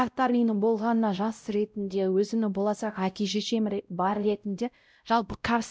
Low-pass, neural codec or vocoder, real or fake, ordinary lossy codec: none; codec, 16 kHz, 0.5 kbps, X-Codec, WavLM features, trained on Multilingual LibriSpeech; fake; none